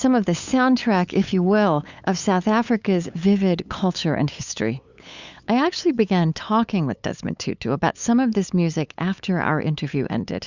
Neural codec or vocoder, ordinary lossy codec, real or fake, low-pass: codec, 16 kHz, 8 kbps, FunCodec, trained on LibriTTS, 25 frames a second; Opus, 64 kbps; fake; 7.2 kHz